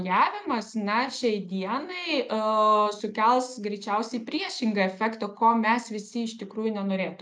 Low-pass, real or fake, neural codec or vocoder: 9.9 kHz; real; none